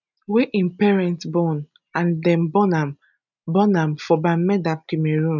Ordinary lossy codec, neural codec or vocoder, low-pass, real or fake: none; none; 7.2 kHz; real